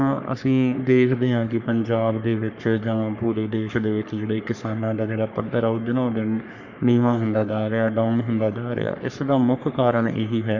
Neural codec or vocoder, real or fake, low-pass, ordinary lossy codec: codec, 44.1 kHz, 3.4 kbps, Pupu-Codec; fake; 7.2 kHz; none